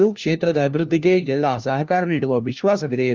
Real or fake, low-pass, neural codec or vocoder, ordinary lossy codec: fake; 7.2 kHz; codec, 16 kHz, 1 kbps, FunCodec, trained on LibriTTS, 50 frames a second; Opus, 32 kbps